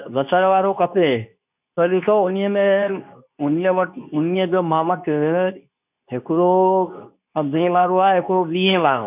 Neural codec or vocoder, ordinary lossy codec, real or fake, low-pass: codec, 24 kHz, 0.9 kbps, WavTokenizer, medium speech release version 2; none; fake; 3.6 kHz